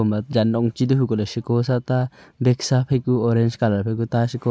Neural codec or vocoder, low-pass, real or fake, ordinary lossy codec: none; none; real; none